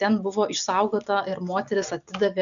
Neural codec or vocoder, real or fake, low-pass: none; real; 7.2 kHz